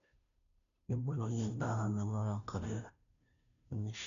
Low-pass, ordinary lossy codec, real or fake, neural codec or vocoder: 7.2 kHz; none; fake; codec, 16 kHz, 0.5 kbps, FunCodec, trained on Chinese and English, 25 frames a second